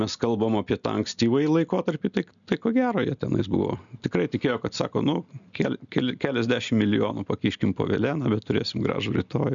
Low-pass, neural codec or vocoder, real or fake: 7.2 kHz; none; real